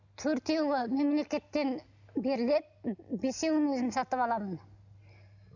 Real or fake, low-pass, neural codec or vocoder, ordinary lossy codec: real; 7.2 kHz; none; none